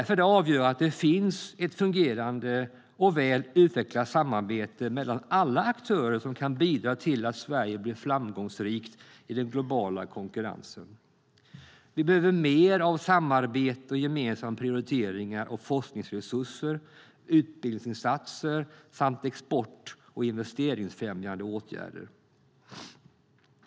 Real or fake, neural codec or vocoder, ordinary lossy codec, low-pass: real; none; none; none